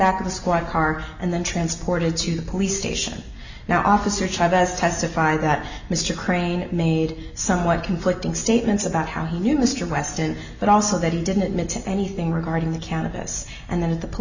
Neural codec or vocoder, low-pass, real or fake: none; 7.2 kHz; real